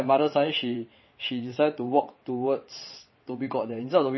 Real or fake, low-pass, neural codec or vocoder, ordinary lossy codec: fake; 7.2 kHz; vocoder, 22.05 kHz, 80 mel bands, WaveNeXt; MP3, 24 kbps